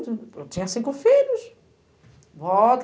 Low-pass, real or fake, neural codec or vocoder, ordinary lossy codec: none; real; none; none